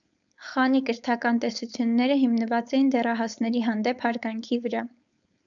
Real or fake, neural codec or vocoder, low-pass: fake; codec, 16 kHz, 4.8 kbps, FACodec; 7.2 kHz